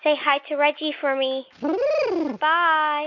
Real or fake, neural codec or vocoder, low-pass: real; none; 7.2 kHz